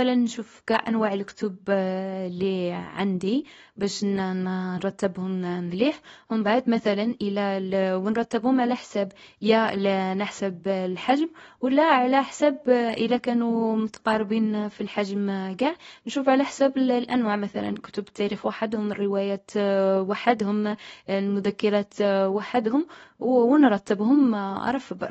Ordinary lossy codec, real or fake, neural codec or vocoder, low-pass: AAC, 24 kbps; fake; codec, 24 kHz, 0.9 kbps, WavTokenizer, small release; 10.8 kHz